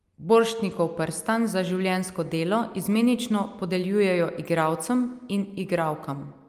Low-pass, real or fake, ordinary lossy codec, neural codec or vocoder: 14.4 kHz; real; Opus, 32 kbps; none